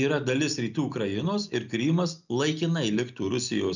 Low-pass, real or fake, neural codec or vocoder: 7.2 kHz; real; none